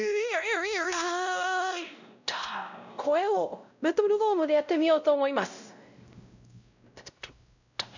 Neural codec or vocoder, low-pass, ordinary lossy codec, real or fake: codec, 16 kHz, 0.5 kbps, X-Codec, WavLM features, trained on Multilingual LibriSpeech; 7.2 kHz; none; fake